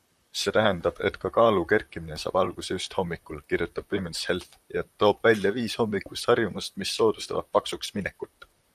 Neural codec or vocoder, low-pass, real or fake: vocoder, 44.1 kHz, 128 mel bands, Pupu-Vocoder; 14.4 kHz; fake